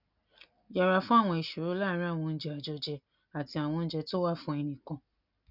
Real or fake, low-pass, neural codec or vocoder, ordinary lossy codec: real; 5.4 kHz; none; none